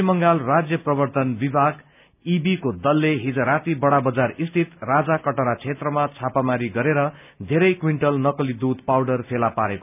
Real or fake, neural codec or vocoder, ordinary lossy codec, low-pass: real; none; none; 3.6 kHz